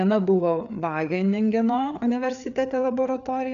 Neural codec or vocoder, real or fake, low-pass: codec, 16 kHz, 4 kbps, FreqCodec, larger model; fake; 7.2 kHz